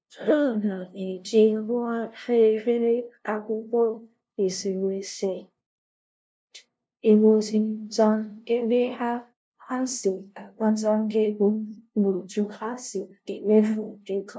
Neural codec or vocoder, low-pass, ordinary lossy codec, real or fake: codec, 16 kHz, 0.5 kbps, FunCodec, trained on LibriTTS, 25 frames a second; none; none; fake